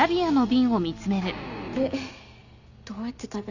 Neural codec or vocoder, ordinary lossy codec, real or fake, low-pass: none; none; real; 7.2 kHz